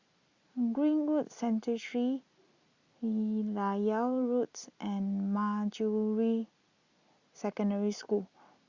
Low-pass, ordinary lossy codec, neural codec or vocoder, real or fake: 7.2 kHz; Opus, 64 kbps; none; real